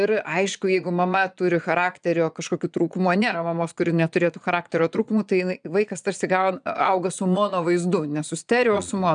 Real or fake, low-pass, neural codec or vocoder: fake; 9.9 kHz; vocoder, 22.05 kHz, 80 mel bands, Vocos